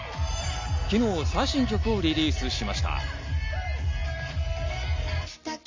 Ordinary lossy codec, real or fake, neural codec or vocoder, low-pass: MP3, 64 kbps; real; none; 7.2 kHz